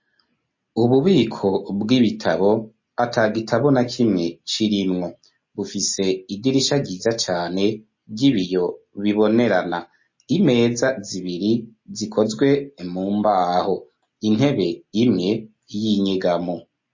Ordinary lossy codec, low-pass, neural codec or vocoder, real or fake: MP3, 32 kbps; 7.2 kHz; none; real